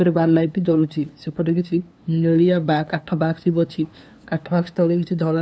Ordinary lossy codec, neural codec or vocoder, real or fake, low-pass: none; codec, 16 kHz, 2 kbps, FunCodec, trained on LibriTTS, 25 frames a second; fake; none